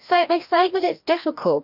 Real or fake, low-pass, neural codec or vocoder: fake; 5.4 kHz; codec, 16 kHz, 1 kbps, FreqCodec, larger model